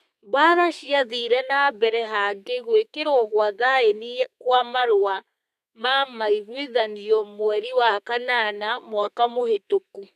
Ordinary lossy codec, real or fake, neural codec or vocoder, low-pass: none; fake; codec, 32 kHz, 1.9 kbps, SNAC; 14.4 kHz